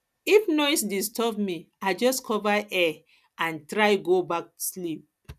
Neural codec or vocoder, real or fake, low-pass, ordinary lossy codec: none; real; 14.4 kHz; none